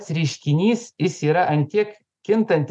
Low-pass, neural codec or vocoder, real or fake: 10.8 kHz; none; real